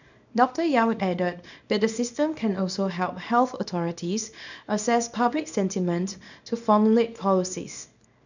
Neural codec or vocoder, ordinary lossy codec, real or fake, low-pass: codec, 24 kHz, 0.9 kbps, WavTokenizer, small release; none; fake; 7.2 kHz